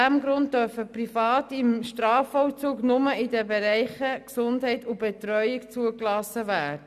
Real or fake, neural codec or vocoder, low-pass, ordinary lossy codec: real; none; 14.4 kHz; none